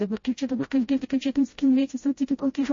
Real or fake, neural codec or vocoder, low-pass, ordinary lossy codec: fake; codec, 16 kHz, 0.5 kbps, FreqCodec, smaller model; 7.2 kHz; MP3, 32 kbps